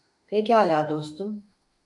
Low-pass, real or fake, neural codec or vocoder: 10.8 kHz; fake; autoencoder, 48 kHz, 32 numbers a frame, DAC-VAE, trained on Japanese speech